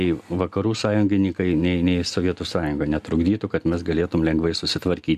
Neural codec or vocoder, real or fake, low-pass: none; real; 14.4 kHz